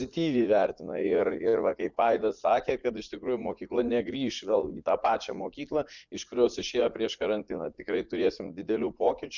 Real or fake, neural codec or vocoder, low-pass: fake; vocoder, 44.1 kHz, 80 mel bands, Vocos; 7.2 kHz